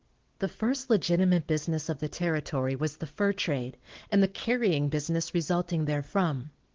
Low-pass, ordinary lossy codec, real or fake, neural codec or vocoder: 7.2 kHz; Opus, 16 kbps; real; none